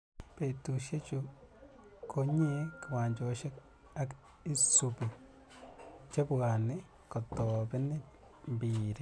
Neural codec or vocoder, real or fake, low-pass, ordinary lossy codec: none; real; none; none